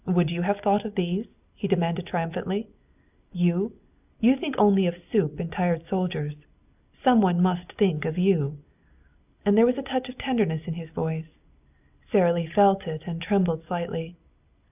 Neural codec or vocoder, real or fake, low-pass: none; real; 3.6 kHz